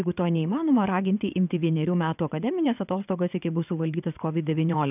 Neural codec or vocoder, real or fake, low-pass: vocoder, 22.05 kHz, 80 mel bands, WaveNeXt; fake; 3.6 kHz